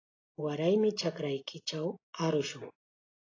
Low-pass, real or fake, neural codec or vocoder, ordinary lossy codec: 7.2 kHz; real; none; AAC, 32 kbps